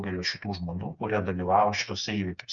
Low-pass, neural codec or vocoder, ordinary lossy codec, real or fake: 7.2 kHz; codec, 16 kHz, 4 kbps, FreqCodec, smaller model; Opus, 64 kbps; fake